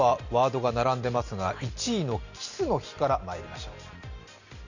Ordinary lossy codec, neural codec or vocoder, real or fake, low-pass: none; none; real; 7.2 kHz